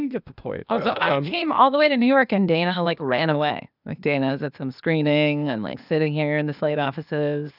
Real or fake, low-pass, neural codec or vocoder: fake; 5.4 kHz; codec, 16 kHz, 0.8 kbps, ZipCodec